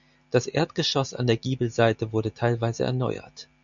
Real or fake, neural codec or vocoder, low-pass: real; none; 7.2 kHz